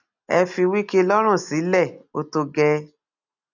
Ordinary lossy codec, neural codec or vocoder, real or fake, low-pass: none; none; real; 7.2 kHz